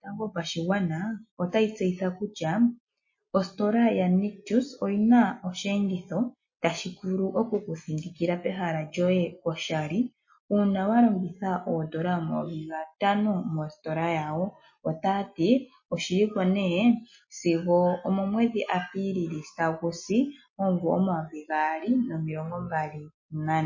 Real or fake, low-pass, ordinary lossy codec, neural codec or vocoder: real; 7.2 kHz; MP3, 32 kbps; none